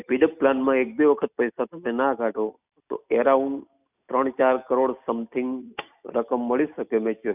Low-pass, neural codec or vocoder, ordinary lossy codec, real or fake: 3.6 kHz; none; none; real